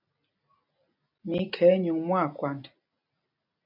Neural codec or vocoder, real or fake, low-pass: none; real; 5.4 kHz